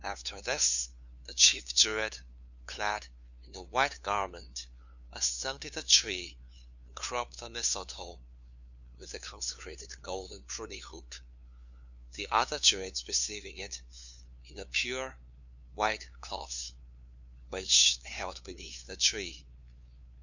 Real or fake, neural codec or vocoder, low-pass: fake; codec, 16 kHz, 2 kbps, FunCodec, trained on LibriTTS, 25 frames a second; 7.2 kHz